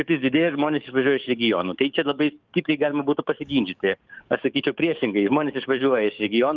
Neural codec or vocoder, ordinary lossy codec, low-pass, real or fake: codec, 44.1 kHz, 7.8 kbps, DAC; Opus, 24 kbps; 7.2 kHz; fake